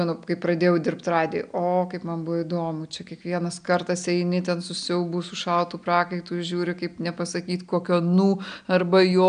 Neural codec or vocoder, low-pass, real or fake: none; 9.9 kHz; real